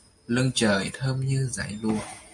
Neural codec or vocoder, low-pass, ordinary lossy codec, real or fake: none; 10.8 kHz; MP3, 64 kbps; real